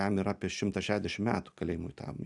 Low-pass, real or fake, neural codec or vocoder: 10.8 kHz; real; none